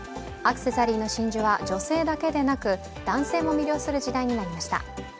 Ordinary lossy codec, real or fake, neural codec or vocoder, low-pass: none; real; none; none